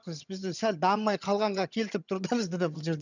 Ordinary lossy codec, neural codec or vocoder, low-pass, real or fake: none; vocoder, 22.05 kHz, 80 mel bands, HiFi-GAN; 7.2 kHz; fake